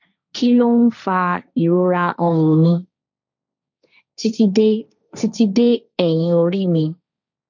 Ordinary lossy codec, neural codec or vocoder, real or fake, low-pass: none; codec, 16 kHz, 1.1 kbps, Voila-Tokenizer; fake; 7.2 kHz